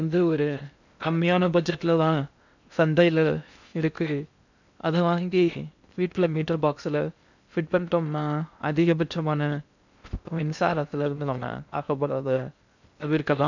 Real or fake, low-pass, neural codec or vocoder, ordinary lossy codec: fake; 7.2 kHz; codec, 16 kHz in and 24 kHz out, 0.6 kbps, FocalCodec, streaming, 2048 codes; none